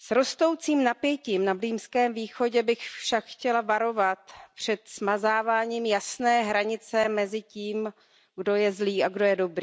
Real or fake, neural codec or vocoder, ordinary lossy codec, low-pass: real; none; none; none